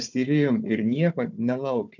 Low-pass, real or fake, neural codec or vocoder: 7.2 kHz; fake; codec, 16 kHz, 4 kbps, FunCodec, trained on Chinese and English, 50 frames a second